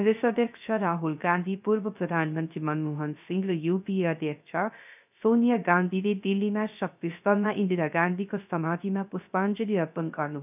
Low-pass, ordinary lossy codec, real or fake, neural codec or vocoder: 3.6 kHz; none; fake; codec, 16 kHz, 0.3 kbps, FocalCodec